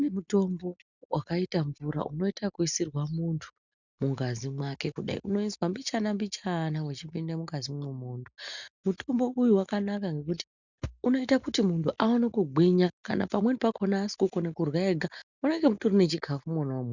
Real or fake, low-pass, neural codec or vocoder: real; 7.2 kHz; none